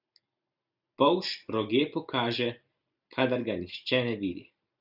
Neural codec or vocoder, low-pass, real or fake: none; 5.4 kHz; real